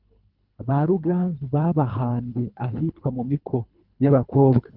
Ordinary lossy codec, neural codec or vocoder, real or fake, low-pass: Opus, 32 kbps; codec, 24 kHz, 3 kbps, HILCodec; fake; 5.4 kHz